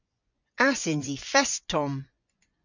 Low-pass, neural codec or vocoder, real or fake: 7.2 kHz; vocoder, 24 kHz, 100 mel bands, Vocos; fake